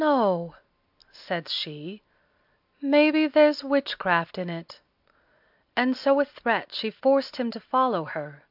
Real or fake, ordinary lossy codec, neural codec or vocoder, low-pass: real; AAC, 48 kbps; none; 5.4 kHz